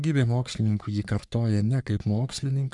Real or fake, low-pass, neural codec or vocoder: fake; 10.8 kHz; codec, 44.1 kHz, 3.4 kbps, Pupu-Codec